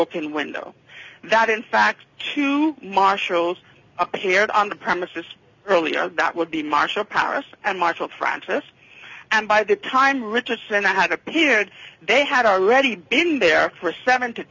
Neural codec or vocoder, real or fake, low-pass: none; real; 7.2 kHz